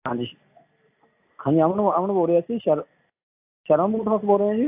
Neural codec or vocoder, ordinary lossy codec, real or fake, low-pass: none; AAC, 24 kbps; real; 3.6 kHz